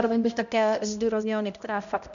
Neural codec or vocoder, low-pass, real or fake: codec, 16 kHz, 0.5 kbps, X-Codec, HuBERT features, trained on balanced general audio; 7.2 kHz; fake